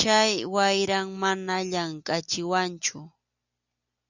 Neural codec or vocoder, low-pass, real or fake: none; 7.2 kHz; real